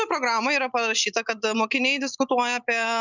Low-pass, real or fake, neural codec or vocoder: 7.2 kHz; real; none